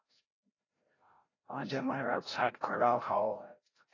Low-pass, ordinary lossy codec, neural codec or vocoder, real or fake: 7.2 kHz; AAC, 32 kbps; codec, 16 kHz, 0.5 kbps, FreqCodec, larger model; fake